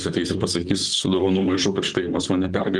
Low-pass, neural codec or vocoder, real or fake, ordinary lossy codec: 10.8 kHz; vocoder, 44.1 kHz, 128 mel bands, Pupu-Vocoder; fake; Opus, 24 kbps